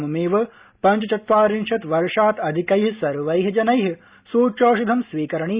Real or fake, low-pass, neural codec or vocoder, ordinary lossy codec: real; 3.6 kHz; none; Opus, 64 kbps